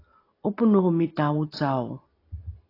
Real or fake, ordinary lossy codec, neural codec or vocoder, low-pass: real; AAC, 24 kbps; none; 5.4 kHz